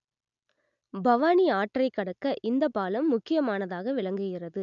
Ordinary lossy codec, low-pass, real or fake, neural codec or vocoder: none; 7.2 kHz; real; none